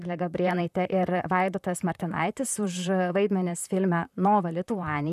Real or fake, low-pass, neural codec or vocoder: fake; 14.4 kHz; vocoder, 44.1 kHz, 128 mel bands, Pupu-Vocoder